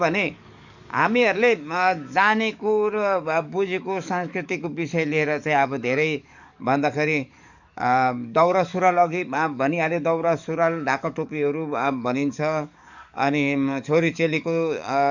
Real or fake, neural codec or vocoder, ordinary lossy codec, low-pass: fake; codec, 44.1 kHz, 7.8 kbps, DAC; none; 7.2 kHz